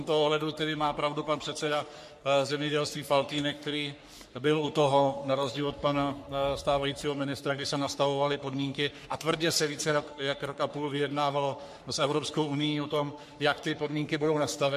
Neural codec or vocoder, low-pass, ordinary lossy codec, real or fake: codec, 44.1 kHz, 3.4 kbps, Pupu-Codec; 14.4 kHz; MP3, 64 kbps; fake